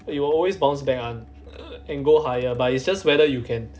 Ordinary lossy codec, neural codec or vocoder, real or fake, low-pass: none; none; real; none